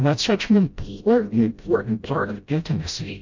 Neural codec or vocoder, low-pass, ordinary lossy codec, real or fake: codec, 16 kHz, 0.5 kbps, FreqCodec, smaller model; 7.2 kHz; MP3, 48 kbps; fake